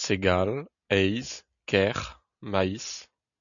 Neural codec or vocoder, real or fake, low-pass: none; real; 7.2 kHz